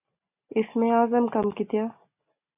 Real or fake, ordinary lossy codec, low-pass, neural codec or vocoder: real; AAC, 32 kbps; 3.6 kHz; none